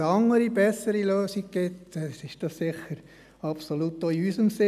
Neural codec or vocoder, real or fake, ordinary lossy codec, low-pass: none; real; none; 14.4 kHz